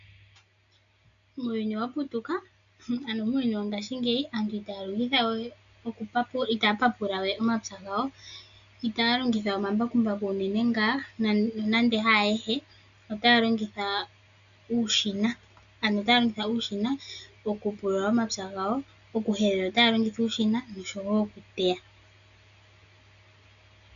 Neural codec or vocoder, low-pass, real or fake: none; 7.2 kHz; real